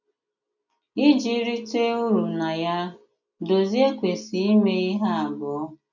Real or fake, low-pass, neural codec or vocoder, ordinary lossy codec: real; 7.2 kHz; none; none